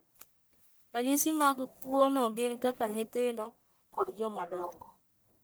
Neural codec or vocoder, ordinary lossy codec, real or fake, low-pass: codec, 44.1 kHz, 1.7 kbps, Pupu-Codec; none; fake; none